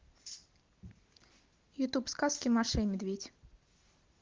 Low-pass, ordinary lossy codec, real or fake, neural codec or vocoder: 7.2 kHz; Opus, 16 kbps; real; none